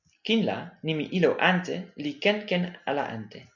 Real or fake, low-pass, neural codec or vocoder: real; 7.2 kHz; none